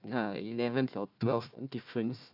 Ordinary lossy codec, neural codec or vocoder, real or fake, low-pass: AAC, 48 kbps; codec, 16 kHz, 1 kbps, FunCodec, trained on Chinese and English, 50 frames a second; fake; 5.4 kHz